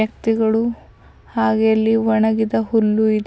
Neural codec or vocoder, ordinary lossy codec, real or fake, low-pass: none; none; real; none